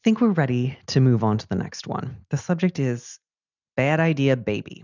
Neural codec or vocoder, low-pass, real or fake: none; 7.2 kHz; real